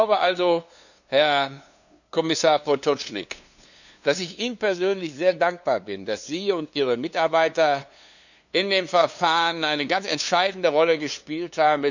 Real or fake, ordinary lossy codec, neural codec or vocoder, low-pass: fake; none; codec, 16 kHz, 2 kbps, FunCodec, trained on LibriTTS, 25 frames a second; 7.2 kHz